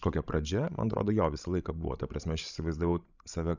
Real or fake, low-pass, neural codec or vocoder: fake; 7.2 kHz; codec, 16 kHz, 16 kbps, FreqCodec, larger model